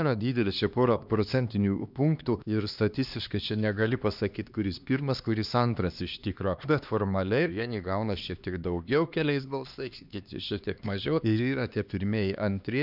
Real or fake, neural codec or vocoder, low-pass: fake; codec, 16 kHz, 2 kbps, X-Codec, HuBERT features, trained on LibriSpeech; 5.4 kHz